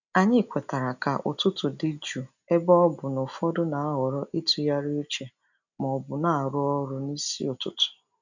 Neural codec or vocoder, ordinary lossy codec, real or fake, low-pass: none; none; real; 7.2 kHz